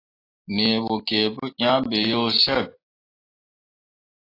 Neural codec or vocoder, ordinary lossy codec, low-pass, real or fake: none; AAC, 24 kbps; 5.4 kHz; real